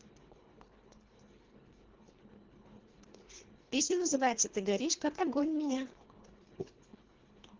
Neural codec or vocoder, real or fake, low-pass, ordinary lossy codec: codec, 24 kHz, 1.5 kbps, HILCodec; fake; 7.2 kHz; Opus, 16 kbps